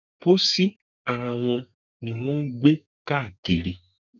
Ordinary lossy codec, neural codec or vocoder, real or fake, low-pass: none; codec, 32 kHz, 1.9 kbps, SNAC; fake; 7.2 kHz